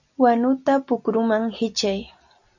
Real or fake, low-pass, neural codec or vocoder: real; 7.2 kHz; none